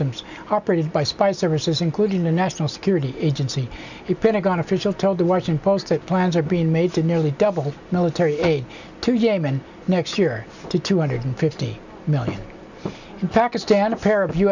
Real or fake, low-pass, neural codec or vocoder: real; 7.2 kHz; none